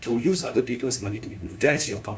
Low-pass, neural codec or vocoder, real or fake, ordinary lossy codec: none; codec, 16 kHz, 1 kbps, FunCodec, trained on LibriTTS, 50 frames a second; fake; none